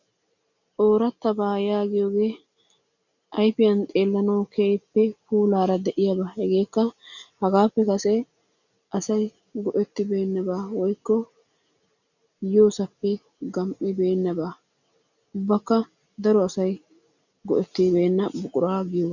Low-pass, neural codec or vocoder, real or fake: 7.2 kHz; none; real